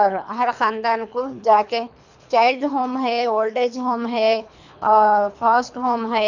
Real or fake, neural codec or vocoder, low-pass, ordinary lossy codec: fake; codec, 24 kHz, 3 kbps, HILCodec; 7.2 kHz; none